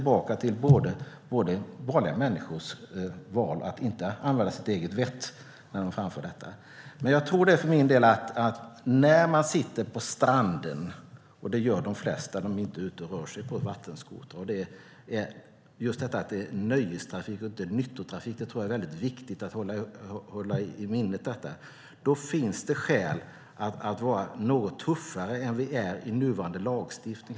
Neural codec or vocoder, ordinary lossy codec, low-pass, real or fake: none; none; none; real